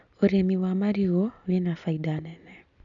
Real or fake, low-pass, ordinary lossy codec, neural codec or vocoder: real; 7.2 kHz; none; none